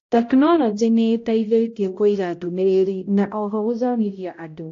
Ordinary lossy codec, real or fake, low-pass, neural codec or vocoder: AAC, 48 kbps; fake; 7.2 kHz; codec, 16 kHz, 0.5 kbps, X-Codec, HuBERT features, trained on balanced general audio